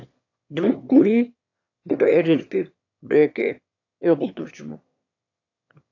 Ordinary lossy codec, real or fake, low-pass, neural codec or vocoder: AAC, 48 kbps; fake; 7.2 kHz; autoencoder, 22.05 kHz, a latent of 192 numbers a frame, VITS, trained on one speaker